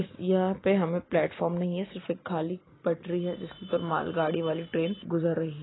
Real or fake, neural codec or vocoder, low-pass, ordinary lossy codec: real; none; 7.2 kHz; AAC, 16 kbps